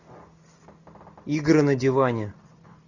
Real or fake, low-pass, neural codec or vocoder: real; 7.2 kHz; none